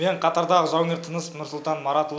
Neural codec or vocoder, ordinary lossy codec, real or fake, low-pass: none; none; real; none